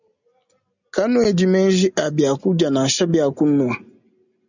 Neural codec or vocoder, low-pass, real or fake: none; 7.2 kHz; real